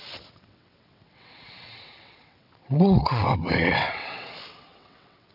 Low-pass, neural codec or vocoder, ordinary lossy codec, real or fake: 5.4 kHz; vocoder, 22.05 kHz, 80 mel bands, WaveNeXt; none; fake